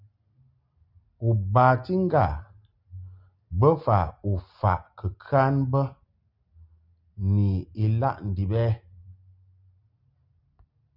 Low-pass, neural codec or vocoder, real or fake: 5.4 kHz; none; real